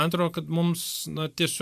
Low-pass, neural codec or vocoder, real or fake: 14.4 kHz; vocoder, 44.1 kHz, 128 mel bands every 512 samples, BigVGAN v2; fake